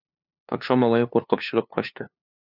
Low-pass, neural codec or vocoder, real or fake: 5.4 kHz; codec, 16 kHz, 2 kbps, FunCodec, trained on LibriTTS, 25 frames a second; fake